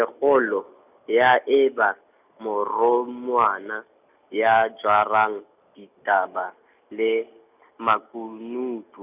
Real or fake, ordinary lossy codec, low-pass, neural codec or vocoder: real; none; 3.6 kHz; none